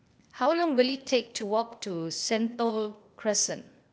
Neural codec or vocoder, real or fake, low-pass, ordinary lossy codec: codec, 16 kHz, 0.8 kbps, ZipCodec; fake; none; none